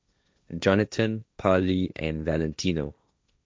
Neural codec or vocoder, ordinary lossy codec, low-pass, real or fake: codec, 16 kHz, 1.1 kbps, Voila-Tokenizer; none; none; fake